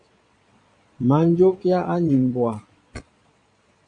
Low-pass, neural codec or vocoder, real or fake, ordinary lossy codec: 9.9 kHz; vocoder, 22.05 kHz, 80 mel bands, Vocos; fake; MP3, 64 kbps